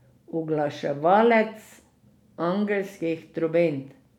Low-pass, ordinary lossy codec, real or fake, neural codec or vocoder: 19.8 kHz; none; real; none